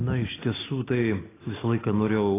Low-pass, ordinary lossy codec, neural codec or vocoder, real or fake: 3.6 kHz; AAC, 16 kbps; none; real